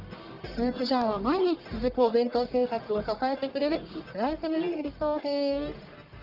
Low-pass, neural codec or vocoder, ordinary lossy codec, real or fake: 5.4 kHz; codec, 44.1 kHz, 1.7 kbps, Pupu-Codec; Opus, 24 kbps; fake